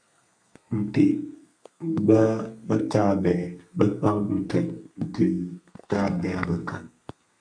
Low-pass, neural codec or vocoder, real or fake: 9.9 kHz; codec, 32 kHz, 1.9 kbps, SNAC; fake